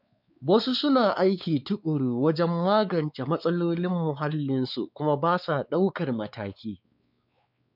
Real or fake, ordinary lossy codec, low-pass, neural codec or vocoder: fake; none; 5.4 kHz; codec, 16 kHz, 4 kbps, X-Codec, WavLM features, trained on Multilingual LibriSpeech